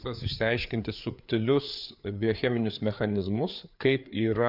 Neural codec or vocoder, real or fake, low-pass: codec, 16 kHz, 4 kbps, FreqCodec, larger model; fake; 5.4 kHz